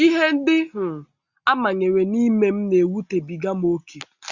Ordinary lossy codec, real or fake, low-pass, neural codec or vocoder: Opus, 64 kbps; real; 7.2 kHz; none